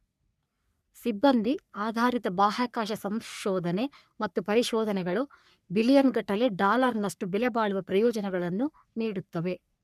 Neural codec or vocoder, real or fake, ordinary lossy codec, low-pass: codec, 44.1 kHz, 3.4 kbps, Pupu-Codec; fake; none; 14.4 kHz